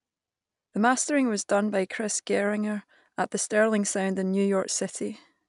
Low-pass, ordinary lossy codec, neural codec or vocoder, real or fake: 10.8 kHz; MP3, 96 kbps; none; real